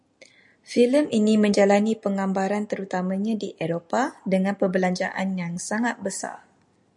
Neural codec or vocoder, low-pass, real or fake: none; 10.8 kHz; real